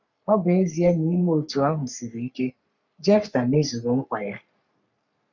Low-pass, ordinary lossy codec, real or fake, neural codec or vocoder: 7.2 kHz; none; fake; codec, 44.1 kHz, 3.4 kbps, Pupu-Codec